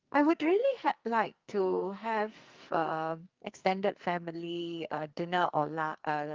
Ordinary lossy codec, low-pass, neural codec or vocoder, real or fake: Opus, 32 kbps; 7.2 kHz; codec, 44.1 kHz, 2.6 kbps, SNAC; fake